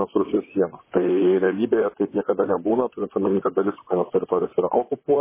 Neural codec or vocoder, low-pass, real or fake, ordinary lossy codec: vocoder, 44.1 kHz, 128 mel bands, Pupu-Vocoder; 3.6 kHz; fake; MP3, 16 kbps